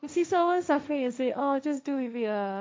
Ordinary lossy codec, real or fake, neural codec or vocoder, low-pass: none; fake; codec, 16 kHz, 1.1 kbps, Voila-Tokenizer; none